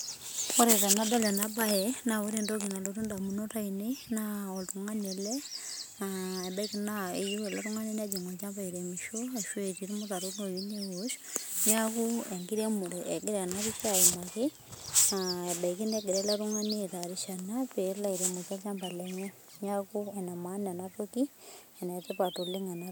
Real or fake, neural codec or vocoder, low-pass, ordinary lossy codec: real; none; none; none